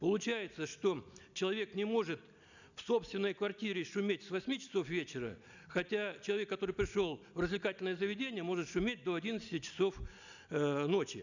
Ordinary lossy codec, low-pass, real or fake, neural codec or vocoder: none; 7.2 kHz; real; none